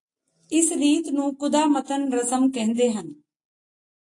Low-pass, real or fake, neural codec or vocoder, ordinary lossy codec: 10.8 kHz; real; none; AAC, 48 kbps